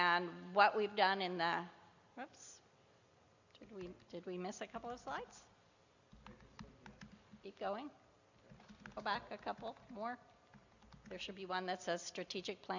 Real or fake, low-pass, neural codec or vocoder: real; 7.2 kHz; none